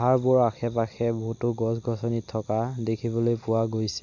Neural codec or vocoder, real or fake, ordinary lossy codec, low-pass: none; real; none; 7.2 kHz